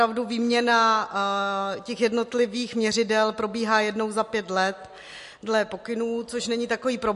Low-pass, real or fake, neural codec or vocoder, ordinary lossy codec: 14.4 kHz; real; none; MP3, 48 kbps